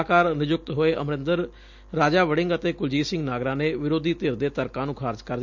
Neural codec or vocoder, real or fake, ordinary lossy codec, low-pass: none; real; MP3, 64 kbps; 7.2 kHz